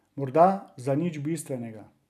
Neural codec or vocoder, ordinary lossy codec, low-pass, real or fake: none; none; 14.4 kHz; real